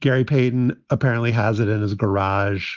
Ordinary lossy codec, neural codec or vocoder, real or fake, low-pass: Opus, 32 kbps; none; real; 7.2 kHz